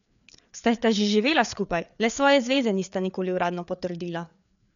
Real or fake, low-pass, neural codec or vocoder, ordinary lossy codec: fake; 7.2 kHz; codec, 16 kHz, 4 kbps, FreqCodec, larger model; none